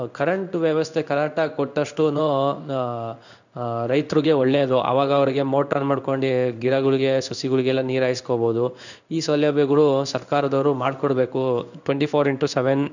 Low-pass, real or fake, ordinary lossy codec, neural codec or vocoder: 7.2 kHz; fake; none; codec, 16 kHz in and 24 kHz out, 1 kbps, XY-Tokenizer